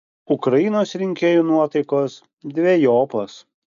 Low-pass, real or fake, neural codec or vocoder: 7.2 kHz; real; none